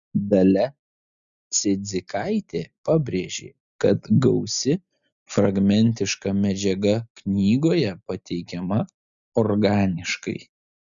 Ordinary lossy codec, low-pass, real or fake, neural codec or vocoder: MP3, 96 kbps; 7.2 kHz; real; none